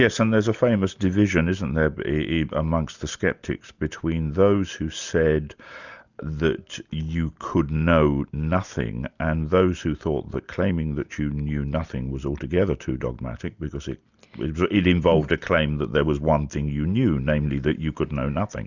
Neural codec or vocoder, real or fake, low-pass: none; real; 7.2 kHz